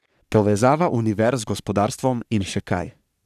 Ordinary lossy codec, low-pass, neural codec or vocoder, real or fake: none; 14.4 kHz; codec, 44.1 kHz, 3.4 kbps, Pupu-Codec; fake